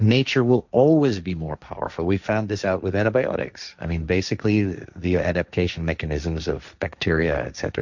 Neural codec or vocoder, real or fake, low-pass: codec, 16 kHz, 1.1 kbps, Voila-Tokenizer; fake; 7.2 kHz